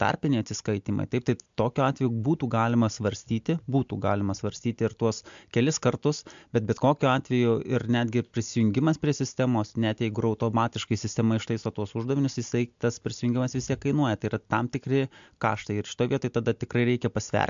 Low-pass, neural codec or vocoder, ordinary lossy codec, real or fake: 7.2 kHz; none; MP3, 64 kbps; real